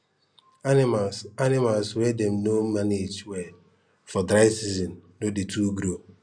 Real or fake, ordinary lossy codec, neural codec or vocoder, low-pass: real; none; none; 9.9 kHz